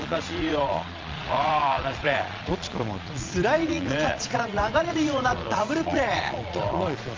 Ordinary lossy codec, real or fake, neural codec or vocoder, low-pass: Opus, 32 kbps; fake; vocoder, 22.05 kHz, 80 mel bands, Vocos; 7.2 kHz